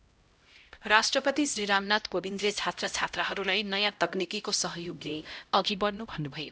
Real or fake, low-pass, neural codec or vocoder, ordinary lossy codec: fake; none; codec, 16 kHz, 0.5 kbps, X-Codec, HuBERT features, trained on LibriSpeech; none